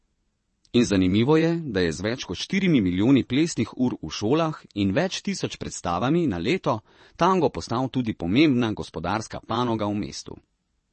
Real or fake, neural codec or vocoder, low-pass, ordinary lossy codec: fake; vocoder, 22.05 kHz, 80 mel bands, WaveNeXt; 9.9 kHz; MP3, 32 kbps